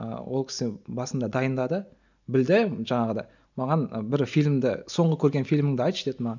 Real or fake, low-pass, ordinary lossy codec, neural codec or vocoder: real; 7.2 kHz; none; none